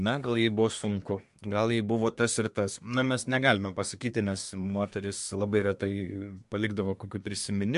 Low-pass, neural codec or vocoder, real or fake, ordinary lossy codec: 10.8 kHz; codec, 24 kHz, 1 kbps, SNAC; fake; MP3, 64 kbps